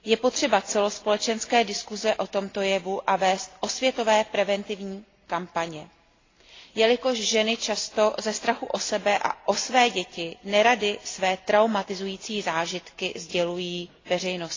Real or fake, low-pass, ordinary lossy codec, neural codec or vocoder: real; 7.2 kHz; AAC, 32 kbps; none